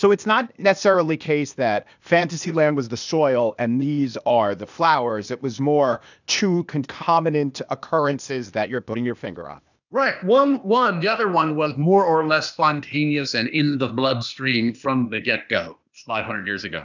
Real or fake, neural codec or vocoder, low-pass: fake; codec, 16 kHz, 0.8 kbps, ZipCodec; 7.2 kHz